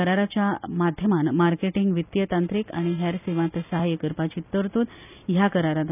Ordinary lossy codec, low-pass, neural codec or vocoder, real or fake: none; 3.6 kHz; none; real